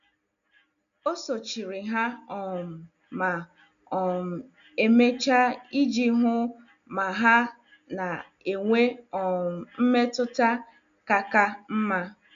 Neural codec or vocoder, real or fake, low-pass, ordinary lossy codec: none; real; 7.2 kHz; none